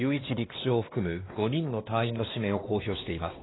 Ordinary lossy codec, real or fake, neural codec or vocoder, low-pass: AAC, 16 kbps; fake; codec, 16 kHz, 2 kbps, X-Codec, WavLM features, trained on Multilingual LibriSpeech; 7.2 kHz